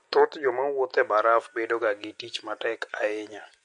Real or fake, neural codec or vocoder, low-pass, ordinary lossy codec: real; none; 9.9 kHz; MP3, 48 kbps